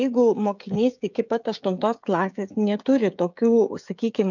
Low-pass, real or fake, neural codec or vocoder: 7.2 kHz; fake; codec, 16 kHz, 16 kbps, FreqCodec, smaller model